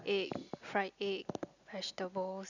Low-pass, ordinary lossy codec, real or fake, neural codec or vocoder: 7.2 kHz; none; real; none